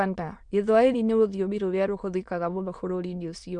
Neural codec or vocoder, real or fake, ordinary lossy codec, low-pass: autoencoder, 22.05 kHz, a latent of 192 numbers a frame, VITS, trained on many speakers; fake; MP3, 64 kbps; 9.9 kHz